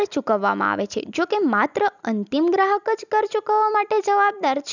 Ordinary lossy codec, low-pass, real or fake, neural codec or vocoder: none; 7.2 kHz; real; none